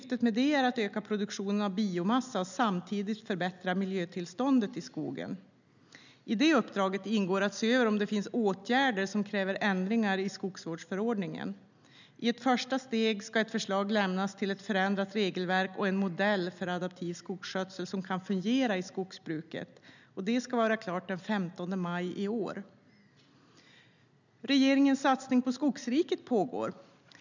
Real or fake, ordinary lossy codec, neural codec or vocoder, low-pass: real; none; none; 7.2 kHz